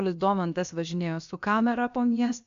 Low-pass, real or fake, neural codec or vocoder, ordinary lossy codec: 7.2 kHz; fake; codec, 16 kHz, about 1 kbps, DyCAST, with the encoder's durations; MP3, 64 kbps